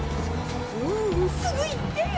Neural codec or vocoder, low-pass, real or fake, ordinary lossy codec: none; none; real; none